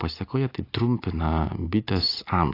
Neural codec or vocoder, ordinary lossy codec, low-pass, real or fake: none; AAC, 32 kbps; 5.4 kHz; real